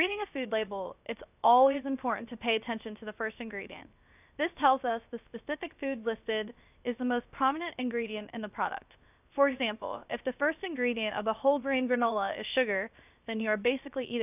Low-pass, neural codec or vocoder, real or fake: 3.6 kHz; codec, 16 kHz, 0.7 kbps, FocalCodec; fake